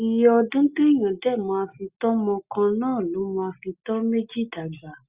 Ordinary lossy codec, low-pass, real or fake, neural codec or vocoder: Opus, 64 kbps; 3.6 kHz; real; none